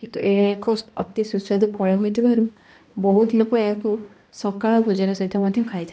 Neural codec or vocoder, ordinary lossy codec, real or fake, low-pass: codec, 16 kHz, 1 kbps, X-Codec, HuBERT features, trained on balanced general audio; none; fake; none